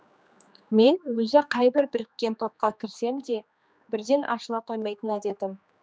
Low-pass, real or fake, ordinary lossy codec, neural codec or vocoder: none; fake; none; codec, 16 kHz, 2 kbps, X-Codec, HuBERT features, trained on general audio